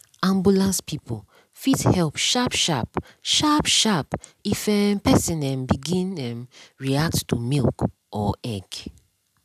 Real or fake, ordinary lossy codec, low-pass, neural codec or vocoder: real; none; 14.4 kHz; none